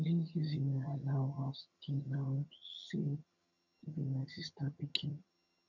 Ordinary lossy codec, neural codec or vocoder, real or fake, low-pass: none; vocoder, 22.05 kHz, 80 mel bands, HiFi-GAN; fake; 7.2 kHz